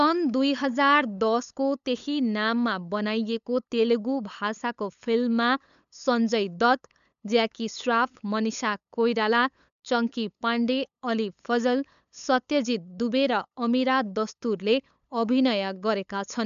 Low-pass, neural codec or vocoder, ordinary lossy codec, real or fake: 7.2 kHz; codec, 16 kHz, 8 kbps, FunCodec, trained on LibriTTS, 25 frames a second; MP3, 96 kbps; fake